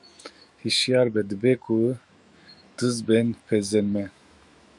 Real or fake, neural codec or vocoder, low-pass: fake; autoencoder, 48 kHz, 128 numbers a frame, DAC-VAE, trained on Japanese speech; 10.8 kHz